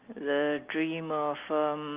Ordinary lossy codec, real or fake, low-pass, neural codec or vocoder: Opus, 32 kbps; real; 3.6 kHz; none